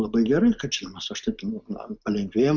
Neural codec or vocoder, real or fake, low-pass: none; real; 7.2 kHz